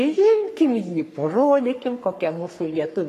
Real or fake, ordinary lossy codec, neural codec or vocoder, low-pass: fake; AAC, 48 kbps; codec, 44.1 kHz, 3.4 kbps, Pupu-Codec; 14.4 kHz